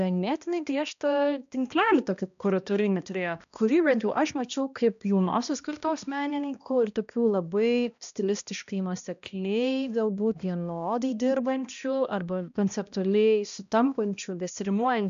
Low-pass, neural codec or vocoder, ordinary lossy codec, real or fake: 7.2 kHz; codec, 16 kHz, 1 kbps, X-Codec, HuBERT features, trained on balanced general audio; AAC, 96 kbps; fake